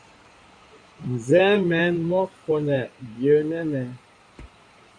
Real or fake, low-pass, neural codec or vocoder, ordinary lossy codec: fake; 9.9 kHz; codec, 16 kHz in and 24 kHz out, 2.2 kbps, FireRedTTS-2 codec; Opus, 64 kbps